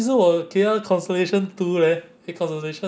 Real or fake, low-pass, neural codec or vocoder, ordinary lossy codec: real; none; none; none